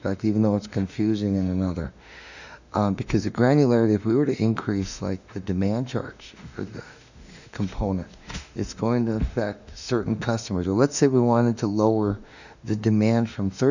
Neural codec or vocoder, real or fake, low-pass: autoencoder, 48 kHz, 32 numbers a frame, DAC-VAE, trained on Japanese speech; fake; 7.2 kHz